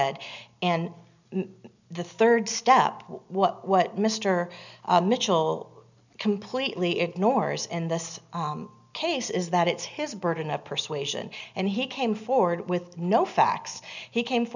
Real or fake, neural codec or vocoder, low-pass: real; none; 7.2 kHz